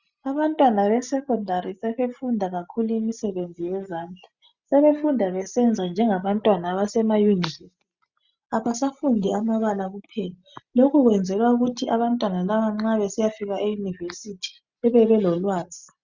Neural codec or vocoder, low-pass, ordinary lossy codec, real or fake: none; 7.2 kHz; Opus, 64 kbps; real